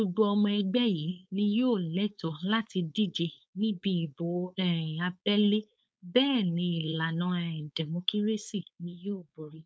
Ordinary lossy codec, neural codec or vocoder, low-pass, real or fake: none; codec, 16 kHz, 4.8 kbps, FACodec; none; fake